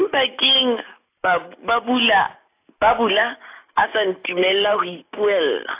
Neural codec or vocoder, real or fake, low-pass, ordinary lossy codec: none; real; 3.6 kHz; AAC, 24 kbps